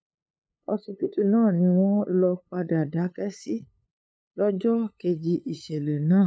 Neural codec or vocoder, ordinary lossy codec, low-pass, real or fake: codec, 16 kHz, 8 kbps, FunCodec, trained on LibriTTS, 25 frames a second; none; none; fake